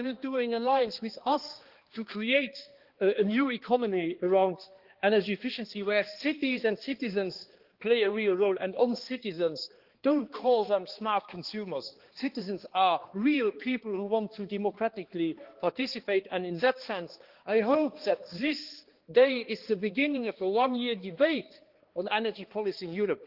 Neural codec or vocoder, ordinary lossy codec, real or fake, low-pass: codec, 16 kHz, 2 kbps, X-Codec, HuBERT features, trained on balanced general audio; Opus, 16 kbps; fake; 5.4 kHz